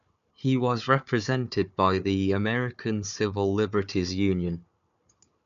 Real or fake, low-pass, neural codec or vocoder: fake; 7.2 kHz; codec, 16 kHz, 4 kbps, FunCodec, trained on Chinese and English, 50 frames a second